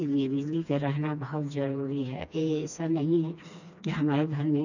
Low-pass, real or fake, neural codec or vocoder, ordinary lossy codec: 7.2 kHz; fake; codec, 16 kHz, 2 kbps, FreqCodec, smaller model; none